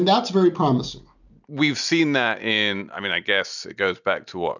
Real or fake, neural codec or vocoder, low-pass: real; none; 7.2 kHz